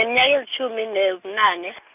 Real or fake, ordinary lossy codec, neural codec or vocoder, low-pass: real; none; none; 3.6 kHz